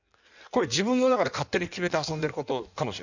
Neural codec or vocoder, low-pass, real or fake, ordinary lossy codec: codec, 16 kHz in and 24 kHz out, 1.1 kbps, FireRedTTS-2 codec; 7.2 kHz; fake; none